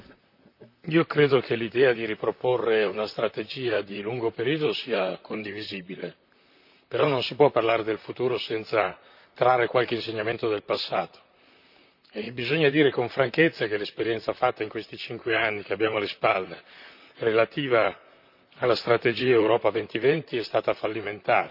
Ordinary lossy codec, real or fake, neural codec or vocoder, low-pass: none; fake; vocoder, 44.1 kHz, 128 mel bands, Pupu-Vocoder; 5.4 kHz